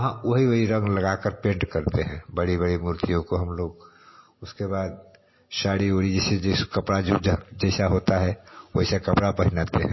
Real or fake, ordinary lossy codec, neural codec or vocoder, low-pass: real; MP3, 24 kbps; none; 7.2 kHz